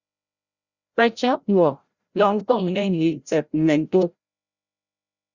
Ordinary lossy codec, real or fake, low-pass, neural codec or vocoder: Opus, 64 kbps; fake; 7.2 kHz; codec, 16 kHz, 0.5 kbps, FreqCodec, larger model